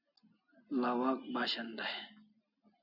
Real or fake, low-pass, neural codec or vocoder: real; 5.4 kHz; none